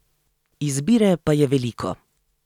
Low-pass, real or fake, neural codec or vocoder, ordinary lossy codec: 19.8 kHz; real; none; none